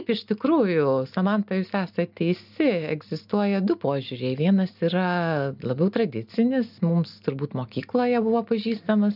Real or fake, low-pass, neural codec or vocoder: real; 5.4 kHz; none